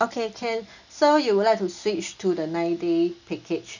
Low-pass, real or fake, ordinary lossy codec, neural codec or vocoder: 7.2 kHz; real; none; none